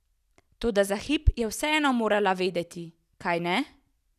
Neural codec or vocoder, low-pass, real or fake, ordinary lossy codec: none; 14.4 kHz; real; none